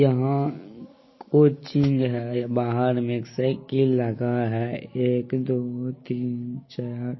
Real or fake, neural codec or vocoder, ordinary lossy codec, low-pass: real; none; MP3, 24 kbps; 7.2 kHz